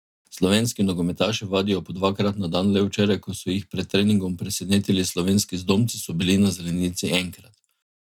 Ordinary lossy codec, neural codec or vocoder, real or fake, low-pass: none; none; real; 19.8 kHz